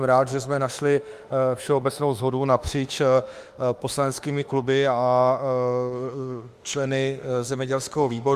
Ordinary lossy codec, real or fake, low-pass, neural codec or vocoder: Opus, 32 kbps; fake; 14.4 kHz; autoencoder, 48 kHz, 32 numbers a frame, DAC-VAE, trained on Japanese speech